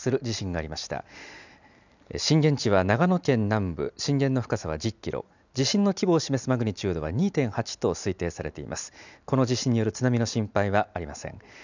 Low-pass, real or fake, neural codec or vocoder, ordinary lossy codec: 7.2 kHz; real; none; none